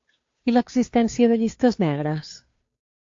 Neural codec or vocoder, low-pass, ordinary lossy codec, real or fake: codec, 16 kHz, 2 kbps, FunCodec, trained on Chinese and English, 25 frames a second; 7.2 kHz; AAC, 48 kbps; fake